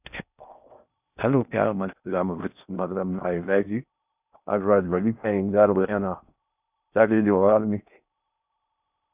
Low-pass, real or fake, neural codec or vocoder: 3.6 kHz; fake; codec, 16 kHz in and 24 kHz out, 0.6 kbps, FocalCodec, streaming, 4096 codes